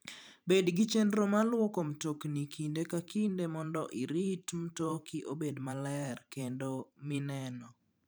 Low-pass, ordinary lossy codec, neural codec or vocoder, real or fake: none; none; vocoder, 44.1 kHz, 128 mel bands every 512 samples, BigVGAN v2; fake